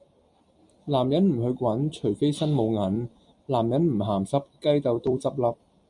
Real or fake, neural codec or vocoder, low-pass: fake; vocoder, 44.1 kHz, 128 mel bands every 256 samples, BigVGAN v2; 10.8 kHz